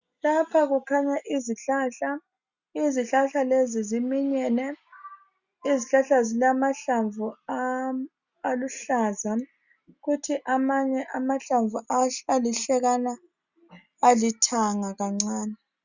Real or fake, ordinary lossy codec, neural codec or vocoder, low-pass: real; Opus, 64 kbps; none; 7.2 kHz